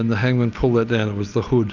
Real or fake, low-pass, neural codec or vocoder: real; 7.2 kHz; none